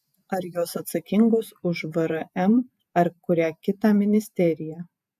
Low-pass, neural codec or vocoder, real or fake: 14.4 kHz; vocoder, 48 kHz, 128 mel bands, Vocos; fake